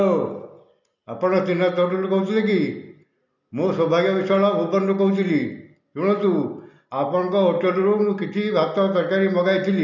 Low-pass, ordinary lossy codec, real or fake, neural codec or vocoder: 7.2 kHz; none; real; none